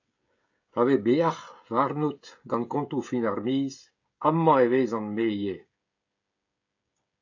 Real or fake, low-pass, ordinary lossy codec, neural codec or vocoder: fake; 7.2 kHz; AAC, 48 kbps; codec, 16 kHz, 16 kbps, FreqCodec, smaller model